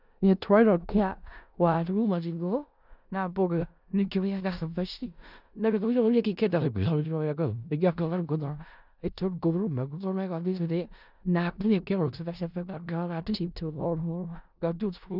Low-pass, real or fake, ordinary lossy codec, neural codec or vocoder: 5.4 kHz; fake; none; codec, 16 kHz in and 24 kHz out, 0.4 kbps, LongCat-Audio-Codec, four codebook decoder